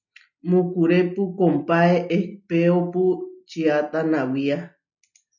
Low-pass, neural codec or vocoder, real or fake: 7.2 kHz; none; real